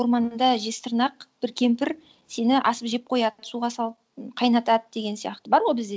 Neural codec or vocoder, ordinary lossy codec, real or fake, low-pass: none; none; real; none